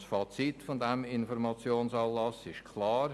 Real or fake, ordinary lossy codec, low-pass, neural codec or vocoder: real; none; none; none